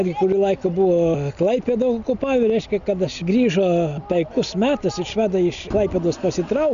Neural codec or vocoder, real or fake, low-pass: none; real; 7.2 kHz